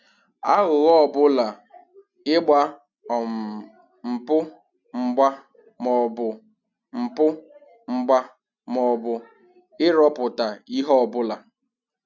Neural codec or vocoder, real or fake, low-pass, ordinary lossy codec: none; real; 7.2 kHz; none